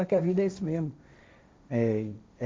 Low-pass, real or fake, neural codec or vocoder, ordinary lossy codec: none; fake; codec, 16 kHz, 1.1 kbps, Voila-Tokenizer; none